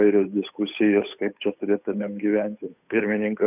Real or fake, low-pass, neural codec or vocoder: fake; 3.6 kHz; codec, 16 kHz, 8 kbps, FunCodec, trained on Chinese and English, 25 frames a second